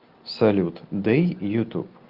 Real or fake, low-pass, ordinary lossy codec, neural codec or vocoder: real; 5.4 kHz; Opus, 24 kbps; none